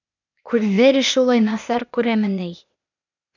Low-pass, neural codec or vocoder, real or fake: 7.2 kHz; codec, 16 kHz, 0.8 kbps, ZipCodec; fake